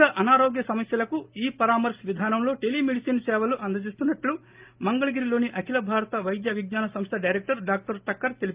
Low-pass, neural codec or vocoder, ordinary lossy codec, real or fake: 3.6 kHz; none; Opus, 24 kbps; real